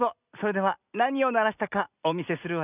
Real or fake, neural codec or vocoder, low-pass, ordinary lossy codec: real; none; 3.6 kHz; none